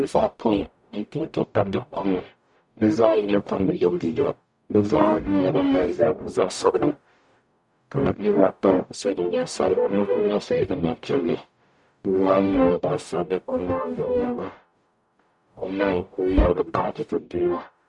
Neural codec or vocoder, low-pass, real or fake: codec, 44.1 kHz, 0.9 kbps, DAC; 10.8 kHz; fake